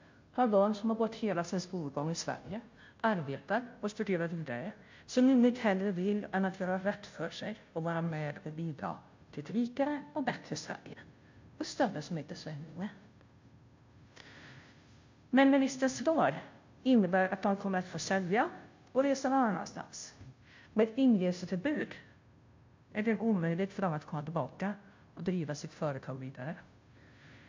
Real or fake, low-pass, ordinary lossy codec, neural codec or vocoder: fake; 7.2 kHz; MP3, 48 kbps; codec, 16 kHz, 0.5 kbps, FunCodec, trained on Chinese and English, 25 frames a second